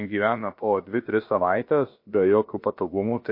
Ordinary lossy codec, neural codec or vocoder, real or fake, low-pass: MP3, 32 kbps; codec, 16 kHz, about 1 kbps, DyCAST, with the encoder's durations; fake; 5.4 kHz